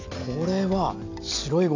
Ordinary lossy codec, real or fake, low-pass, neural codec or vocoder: AAC, 48 kbps; real; 7.2 kHz; none